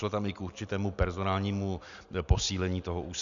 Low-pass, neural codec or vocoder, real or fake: 7.2 kHz; none; real